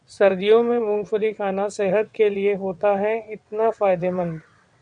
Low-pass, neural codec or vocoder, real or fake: 9.9 kHz; vocoder, 22.05 kHz, 80 mel bands, WaveNeXt; fake